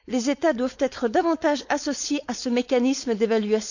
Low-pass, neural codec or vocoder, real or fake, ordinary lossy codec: 7.2 kHz; codec, 16 kHz, 4.8 kbps, FACodec; fake; none